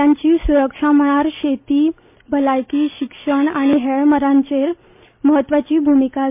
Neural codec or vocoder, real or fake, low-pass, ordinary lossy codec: none; real; 3.6 kHz; MP3, 32 kbps